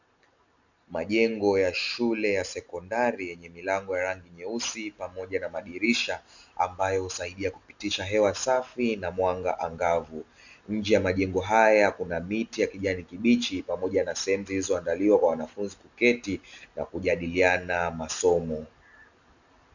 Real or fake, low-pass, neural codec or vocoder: real; 7.2 kHz; none